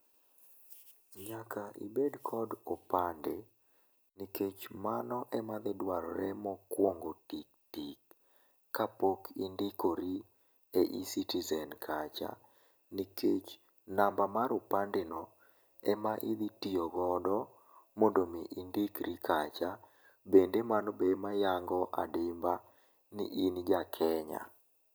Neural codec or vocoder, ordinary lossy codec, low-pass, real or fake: none; none; none; real